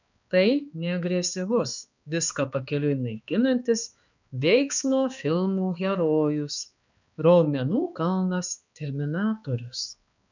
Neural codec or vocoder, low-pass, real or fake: codec, 16 kHz, 2 kbps, X-Codec, HuBERT features, trained on balanced general audio; 7.2 kHz; fake